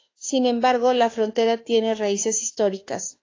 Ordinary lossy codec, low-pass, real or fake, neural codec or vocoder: AAC, 32 kbps; 7.2 kHz; fake; autoencoder, 48 kHz, 32 numbers a frame, DAC-VAE, trained on Japanese speech